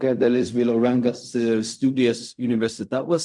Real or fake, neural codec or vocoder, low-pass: fake; codec, 16 kHz in and 24 kHz out, 0.4 kbps, LongCat-Audio-Codec, fine tuned four codebook decoder; 10.8 kHz